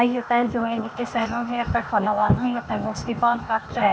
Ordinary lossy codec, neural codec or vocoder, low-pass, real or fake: none; codec, 16 kHz, 0.8 kbps, ZipCodec; none; fake